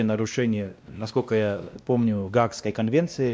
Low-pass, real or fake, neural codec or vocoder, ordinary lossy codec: none; fake; codec, 16 kHz, 1 kbps, X-Codec, WavLM features, trained on Multilingual LibriSpeech; none